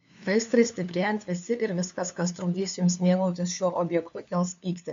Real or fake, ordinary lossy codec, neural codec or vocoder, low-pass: fake; MP3, 64 kbps; codec, 16 kHz, 2 kbps, FunCodec, trained on LibriTTS, 25 frames a second; 7.2 kHz